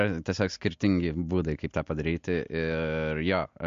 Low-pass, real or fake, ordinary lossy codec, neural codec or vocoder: 7.2 kHz; real; MP3, 48 kbps; none